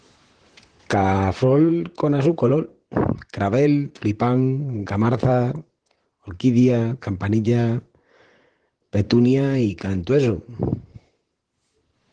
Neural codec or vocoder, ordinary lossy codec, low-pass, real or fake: vocoder, 44.1 kHz, 128 mel bands, Pupu-Vocoder; Opus, 16 kbps; 9.9 kHz; fake